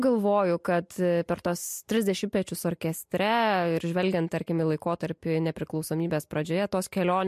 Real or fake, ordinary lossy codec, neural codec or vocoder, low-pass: real; MP3, 64 kbps; none; 14.4 kHz